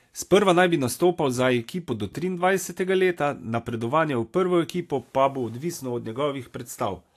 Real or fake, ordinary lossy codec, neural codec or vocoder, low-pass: real; AAC, 64 kbps; none; 14.4 kHz